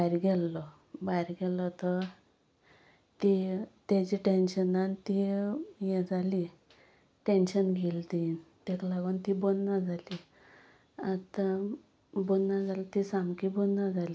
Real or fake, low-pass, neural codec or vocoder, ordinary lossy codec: real; none; none; none